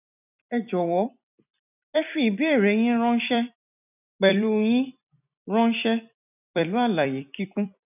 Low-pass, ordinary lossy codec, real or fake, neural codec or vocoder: 3.6 kHz; none; fake; vocoder, 44.1 kHz, 128 mel bands every 256 samples, BigVGAN v2